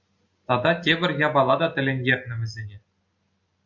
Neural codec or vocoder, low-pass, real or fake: none; 7.2 kHz; real